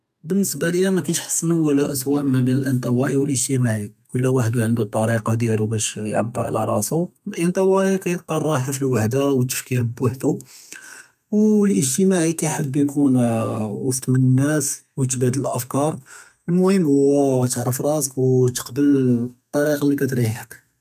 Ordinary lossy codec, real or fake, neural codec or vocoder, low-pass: none; fake; codec, 32 kHz, 1.9 kbps, SNAC; 14.4 kHz